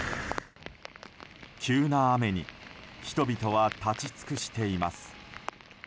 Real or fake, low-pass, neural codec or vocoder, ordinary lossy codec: real; none; none; none